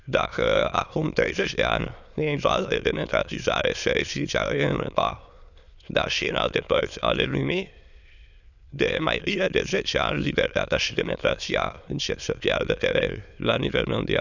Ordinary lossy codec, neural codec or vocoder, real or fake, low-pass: none; autoencoder, 22.05 kHz, a latent of 192 numbers a frame, VITS, trained on many speakers; fake; 7.2 kHz